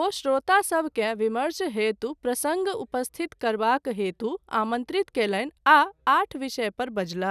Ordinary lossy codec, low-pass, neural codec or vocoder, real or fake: none; 14.4 kHz; none; real